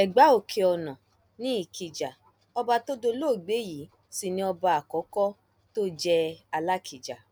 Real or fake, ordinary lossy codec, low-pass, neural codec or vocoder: real; none; none; none